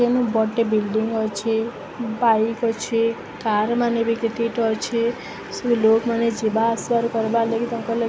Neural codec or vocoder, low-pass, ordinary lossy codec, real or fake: none; none; none; real